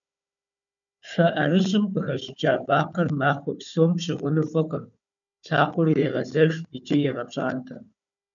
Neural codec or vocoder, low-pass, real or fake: codec, 16 kHz, 4 kbps, FunCodec, trained on Chinese and English, 50 frames a second; 7.2 kHz; fake